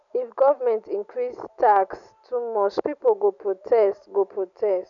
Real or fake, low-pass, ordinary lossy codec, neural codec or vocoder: real; 7.2 kHz; none; none